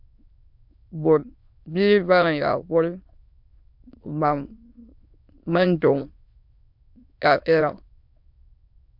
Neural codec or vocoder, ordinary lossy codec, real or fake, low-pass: autoencoder, 22.05 kHz, a latent of 192 numbers a frame, VITS, trained on many speakers; MP3, 48 kbps; fake; 5.4 kHz